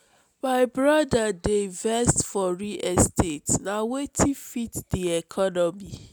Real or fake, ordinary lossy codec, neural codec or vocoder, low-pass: real; none; none; none